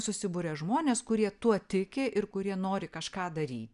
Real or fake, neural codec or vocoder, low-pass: real; none; 10.8 kHz